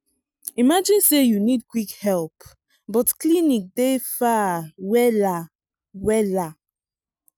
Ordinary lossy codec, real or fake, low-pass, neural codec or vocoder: none; real; 19.8 kHz; none